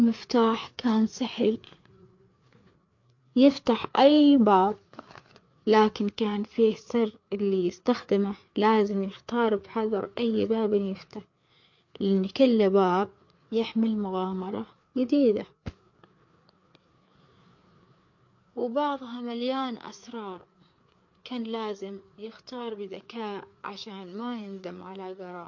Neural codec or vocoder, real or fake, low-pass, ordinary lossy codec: codec, 16 kHz, 4 kbps, FreqCodec, larger model; fake; 7.2 kHz; MP3, 48 kbps